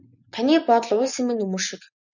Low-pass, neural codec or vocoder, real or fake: 7.2 kHz; vocoder, 24 kHz, 100 mel bands, Vocos; fake